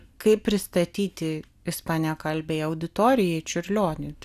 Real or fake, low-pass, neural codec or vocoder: real; 14.4 kHz; none